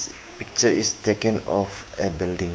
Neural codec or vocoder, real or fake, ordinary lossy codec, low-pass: codec, 16 kHz, 6 kbps, DAC; fake; none; none